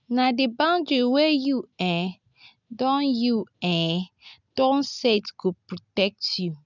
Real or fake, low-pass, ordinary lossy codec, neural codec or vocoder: real; 7.2 kHz; none; none